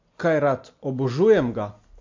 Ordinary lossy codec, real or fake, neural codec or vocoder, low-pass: MP3, 48 kbps; real; none; 7.2 kHz